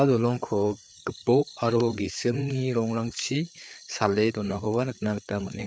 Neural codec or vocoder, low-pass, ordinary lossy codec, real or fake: codec, 16 kHz, 4 kbps, FreqCodec, larger model; none; none; fake